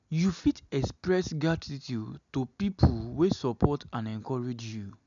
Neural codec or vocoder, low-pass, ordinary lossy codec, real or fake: none; 7.2 kHz; none; real